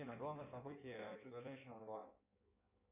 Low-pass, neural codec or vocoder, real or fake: 3.6 kHz; codec, 16 kHz in and 24 kHz out, 1.1 kbps, FireRedTTS-2 codec; fake